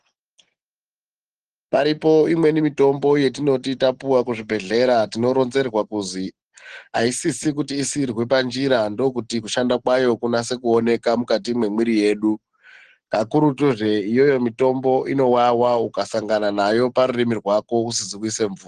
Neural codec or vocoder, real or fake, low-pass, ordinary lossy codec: none; real; 9.9 kHz; Opus, 16 kbps